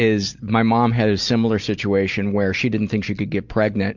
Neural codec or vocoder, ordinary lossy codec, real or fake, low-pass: none; Opus, 64 kbps; real; 7.2 kHz